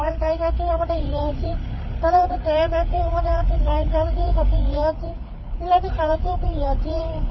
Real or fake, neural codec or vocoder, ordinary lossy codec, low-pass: fake; codec, 16 kHz, 8 kbps, FreqCodec, larger model; MP3, 24 kbps; 7.2 kHz